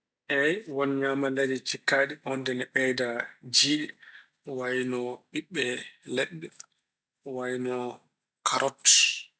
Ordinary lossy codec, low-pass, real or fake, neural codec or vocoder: none; none; real; none